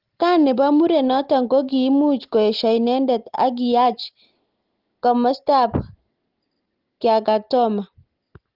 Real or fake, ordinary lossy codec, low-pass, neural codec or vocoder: real; Opus, 16 kbps; 5.4 kHz; none